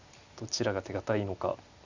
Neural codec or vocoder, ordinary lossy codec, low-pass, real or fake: none; Opus, 64 kbps; 7.2 kHz; real